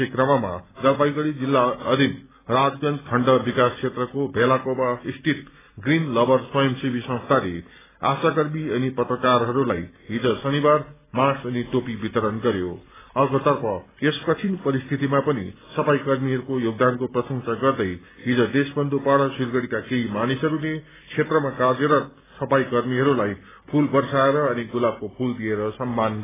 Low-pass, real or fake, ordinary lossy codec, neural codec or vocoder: 3.6 kHz; real; AAC, 16 kbps; none